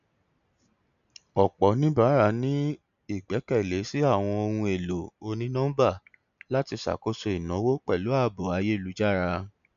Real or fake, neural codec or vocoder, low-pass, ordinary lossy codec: real; none; 7.2 kHz; none